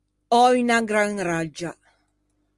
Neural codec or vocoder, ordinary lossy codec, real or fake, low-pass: none; Opus, 24 kbps; real; 10.8 kHz